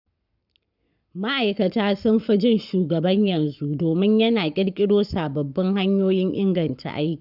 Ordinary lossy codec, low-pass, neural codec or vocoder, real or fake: none; 5.4 kHz; codec, 44.1 kHz, 7.8 kbps, Pupu-Codec; fake